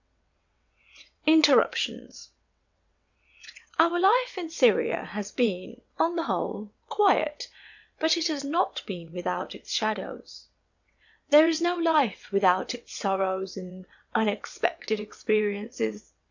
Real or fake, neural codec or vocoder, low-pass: fake; vocoder, 22.05 kHz, 80 mel bands, WaveNeXt; 7.2 kHz